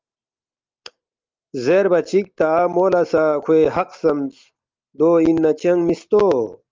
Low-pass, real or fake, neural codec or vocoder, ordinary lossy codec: 7.2 kHz; real; none; Opus, 24 kbps